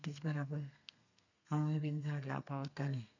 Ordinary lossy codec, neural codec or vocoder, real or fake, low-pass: none; codec, 32 kHz, 1.9 kbps, SNAC; fake; 7.2 kHz